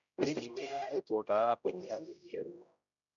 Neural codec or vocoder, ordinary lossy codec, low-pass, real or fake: codec, 16 kHz, 0.5 kbps, X-Codec, HuBERT features, trained on general audio; MP3, 96 kbps; 7.2 kHz; fake